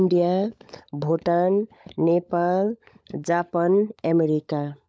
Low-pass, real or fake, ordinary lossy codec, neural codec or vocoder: none; fake; none; codec, 16 kHz, 16 kbps, FunCodec, trained on LibriTTS, 50 frames a second